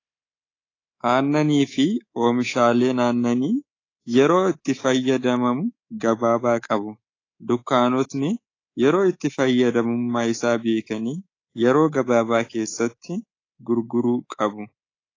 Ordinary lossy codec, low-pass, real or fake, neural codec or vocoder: AAC, 32 kbps; 7.2 kHz; fake; codec, 24 kHz, 3.1 kbps, DualCodec